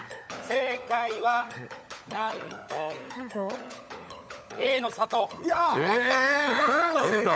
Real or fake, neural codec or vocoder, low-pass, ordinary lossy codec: fake; codec, 16 kHz, 16 kbps, FunCodec, trained on LibriTTS, 50 frames a second; none; none